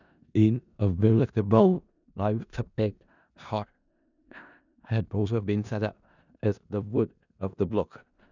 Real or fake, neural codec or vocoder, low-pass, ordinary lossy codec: fake; codec, 16 kHz in and 24 kHz out, 0.4 kbps, LongCat-Audio-Codec, four codebook decoder; 7.2 kHz; none